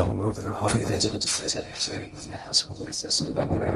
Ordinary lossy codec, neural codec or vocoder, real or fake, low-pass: Opus, 24 kbps; codec, 16 kHz in and 24 kHz out, 0.6 kbps, FocalCodec, streaming, 2048 codes; fake; 10.8 kHz